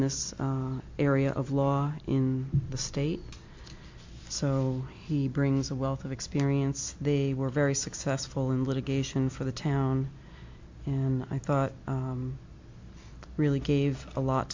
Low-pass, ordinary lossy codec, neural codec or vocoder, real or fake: 7.2 kHz; MP3, 48 kbps; none; real